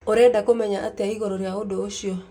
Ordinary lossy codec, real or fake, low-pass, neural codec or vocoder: none; real; 19.8 kHz; none